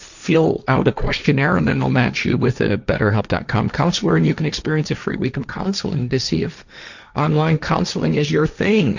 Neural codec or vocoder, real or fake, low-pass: codec, 16 kHz, 1.1 kbps, Voila-Tokenizer; fake; 7.2 kHz